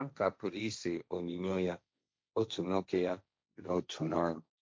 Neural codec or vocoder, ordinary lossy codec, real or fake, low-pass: codec, 16 kHz, 1.1 kbps, Voila-Tokenizer; none; fake; none